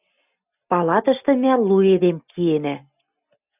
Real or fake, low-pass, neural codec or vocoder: real; 3.6 kHz; none